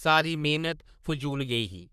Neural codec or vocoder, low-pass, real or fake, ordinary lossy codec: codec, 44.1 kHz, 3.4 kbps, Pupu-Codec; 14.4 kHz; fake; none